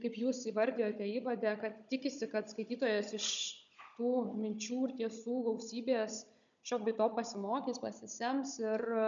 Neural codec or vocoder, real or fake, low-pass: codec, 16 kHz, 16 kbps, FunCodec, trained on Chinese and English, 50 frames a second; fake; 7.2 kHz